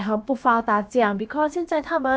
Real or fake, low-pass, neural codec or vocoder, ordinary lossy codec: fake; none; codec, 16 kHz, about 1 kbps, DyCAST, with the encoder's durations; none